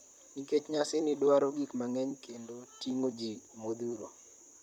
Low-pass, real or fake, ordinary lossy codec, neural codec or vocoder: 19.8 kHz; fake; none; vocoder, 44.1 kHz, 128 mel bands, Pupu-Vocoder